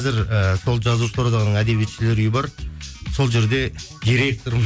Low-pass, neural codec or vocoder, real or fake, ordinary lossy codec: none; none; real; none